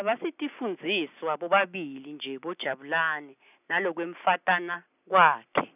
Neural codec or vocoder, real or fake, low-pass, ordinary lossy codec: none; real; 3.6 kHz; none